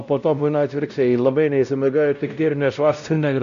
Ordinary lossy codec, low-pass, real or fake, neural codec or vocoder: AAC, 96 kbps; 7.2 kHz; fake; codec, 16 kHz, 0.5 kbps, X-Codec, WavLM features, trained on Multilingual LibriSpeech